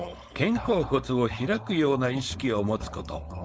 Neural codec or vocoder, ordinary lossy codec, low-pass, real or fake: codec, 16 kHz, 4.8 kbps, FACodec; none; none; fake